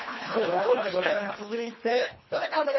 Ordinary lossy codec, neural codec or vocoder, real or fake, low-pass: MP3, 24 kbps; codec, 24 kHz, 1.5 kbps, HILCodec; fake; 7.2 kHz